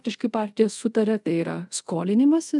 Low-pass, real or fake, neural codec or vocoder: 10.8 kHz; fake; codec, 24 kHz, 0.5 kbps, DualCodec